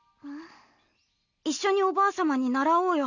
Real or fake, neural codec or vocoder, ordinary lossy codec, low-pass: real; none; none; 7.2 kHz